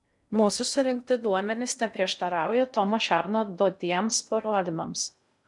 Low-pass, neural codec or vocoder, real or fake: 10.8 kHz; codec, 16 kHz in and 24 kHz out, 0.6 kbps, FocalCodec, streaming, 2048 codes; fake